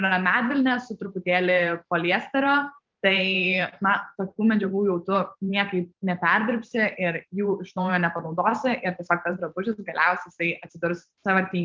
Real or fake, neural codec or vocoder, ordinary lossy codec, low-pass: fake; vocoder, 44.1 kHz, 128 mel bands every 512 samples, BigVGAN v2; Opus, 24 kbps; 7.2 kHz